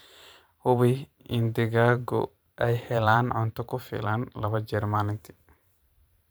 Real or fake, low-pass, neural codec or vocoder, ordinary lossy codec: fake; none; vocoder, 44.1 kHz, 128 mel bands every 512 samples, BigVGAN v2; none